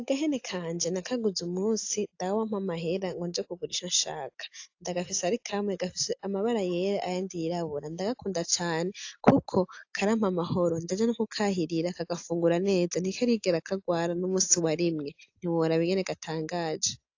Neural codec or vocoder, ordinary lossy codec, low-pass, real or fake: none; AAC, 48 kbps; 7.2 kHz; real